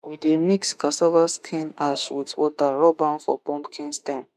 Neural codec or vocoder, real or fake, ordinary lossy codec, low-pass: autoencoder, 48 kHz, 32 numbers a frame, DAC-VAE, trained on Japanese speech; fake; none; 14.4 kHz